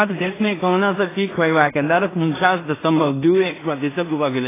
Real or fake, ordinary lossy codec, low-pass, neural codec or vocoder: fake; AAC, 16 kbps; 3.6 kHz; codec, 16 kHz in and 24 kHz out, 0.4 kbps, LongCat-Audio-Codec, two codebook decoder